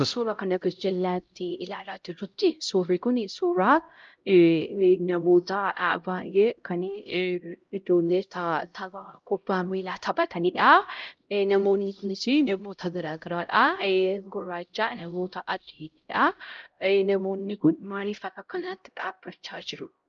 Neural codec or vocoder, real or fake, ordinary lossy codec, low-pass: codec, 16 kHz, 0.5 kbps, X-Codec, HuBERT features, trained on LibriSpeech; fake; Opus, 24 kbps; 7.2 kHz